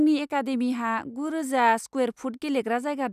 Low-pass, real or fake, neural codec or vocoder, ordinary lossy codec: 14.4 kHz; real; none; none